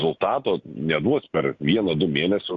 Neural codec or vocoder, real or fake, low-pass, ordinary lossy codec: none; real; 7.2 kHz; Opus, 64 kbps